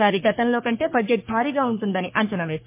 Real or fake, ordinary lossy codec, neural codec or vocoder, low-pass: fake; MP3, 24 kbps; codec, 44.1 kHz, 3.4 kbps, Pupu-Codec; 3.6 kHz